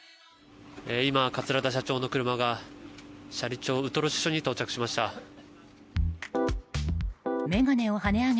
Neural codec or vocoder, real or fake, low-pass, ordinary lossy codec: none; real; none; none